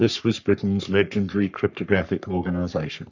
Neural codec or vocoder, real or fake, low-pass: codec, 44.1 kHz, 3.4 kbps, Pupu-Codec; fake; 7.2 kHz